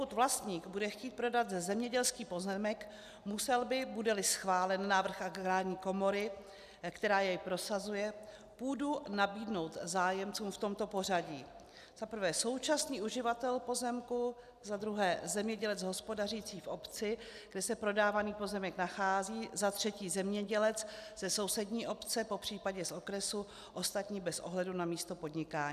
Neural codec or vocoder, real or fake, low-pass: none; real; 14.4 kHz